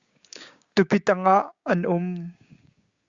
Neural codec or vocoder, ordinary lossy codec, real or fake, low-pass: codec, 16 kHz, 6 kbps, DAC; Opus, 64 kbps; fake; 7.2 kHz